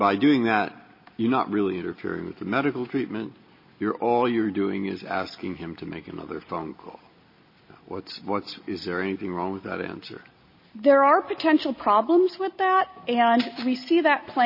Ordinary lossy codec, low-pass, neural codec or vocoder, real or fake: MP3, 24 kbps; 5.4 kHz; none; real